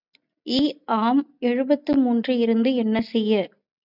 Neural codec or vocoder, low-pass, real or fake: none; 5.4 kHz; real